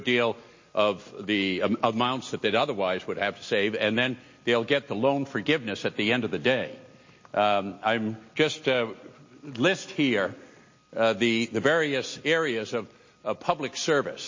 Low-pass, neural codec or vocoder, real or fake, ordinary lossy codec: 7.2 kHz; none; real; MP3, 32 kbps